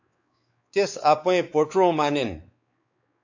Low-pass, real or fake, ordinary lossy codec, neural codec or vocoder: 7.2 kHz; fake; AAC, 48 kbps; codec, 16 kHz, 4 kbps, X-Codec, WavLM features, trained on Multilingual LibriSpeech